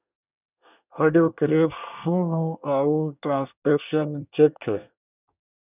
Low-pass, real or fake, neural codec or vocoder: 3.6 kHz; fake; codec, 24 kHz, 1 kbps, SNAC